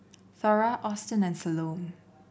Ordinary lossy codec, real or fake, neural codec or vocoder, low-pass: none; real; none; none